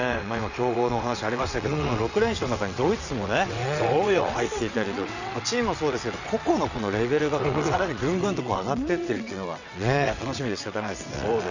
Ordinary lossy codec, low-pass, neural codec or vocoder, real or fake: none; 7.2 kHz; vocoder, 22.05 kHz, 80 mel bands, WaveNeXt; fake